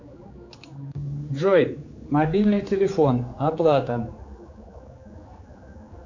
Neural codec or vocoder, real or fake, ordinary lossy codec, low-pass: codec, 16 kHz, 4 kbps, X-Codec, HuBERT features, trained on general audio; fake; AAC, 48 kbps; 7.2 kHz